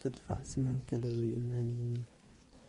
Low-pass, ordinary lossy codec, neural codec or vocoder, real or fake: 10.8 kHz; MP3, 48 kbps; codec, 24 kHz, 1 kbps, SNAC; fake